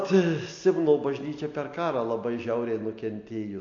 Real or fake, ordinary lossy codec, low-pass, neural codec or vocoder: real; AAC, 48 kbps; 7.2 kHz; none